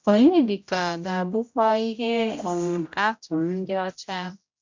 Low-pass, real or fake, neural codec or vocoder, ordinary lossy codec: 7.2 kHz; fake; codec, 16 kHz, 0.5 kbps, X-Codec, HuBERT features, trained on general audio; MP3, 64 kbps